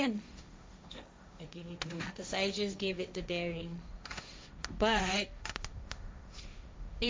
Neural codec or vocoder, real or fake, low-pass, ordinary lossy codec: codec, 16 kHz, 1.1 kbps, Voila-Tokenizer; fake; none; none